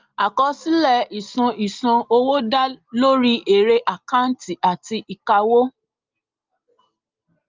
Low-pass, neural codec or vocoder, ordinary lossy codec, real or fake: 7.2 kHz; none; Opus, 24 kbps; real